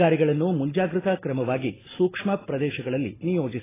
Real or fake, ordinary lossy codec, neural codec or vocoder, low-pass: real; AAC, 16 kbps; none; 3.6 kHz